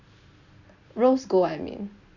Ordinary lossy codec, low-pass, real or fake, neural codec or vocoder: none; 7.2 kHz; real; none